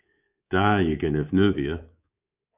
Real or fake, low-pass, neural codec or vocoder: fake; 3.6 kHz; codec, 24 kHz, 3.1 kbps, DualCodec